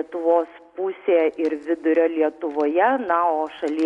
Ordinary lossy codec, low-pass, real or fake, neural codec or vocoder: MP3, 96 kbps; 10.8 kHz; real; none